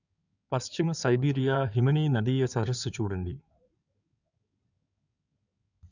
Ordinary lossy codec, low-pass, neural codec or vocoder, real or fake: none; 7.2 kHz; codec, 16 kHz in and 24 kHz out, 2.2 kbps, FireRedTTS-2 codec; fake